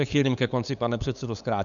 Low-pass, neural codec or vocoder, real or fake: 7.2 kHz; codec, 16 kHz, 8 kbps, FunCodec, trained on LibriTTS, 25 frames a second; fake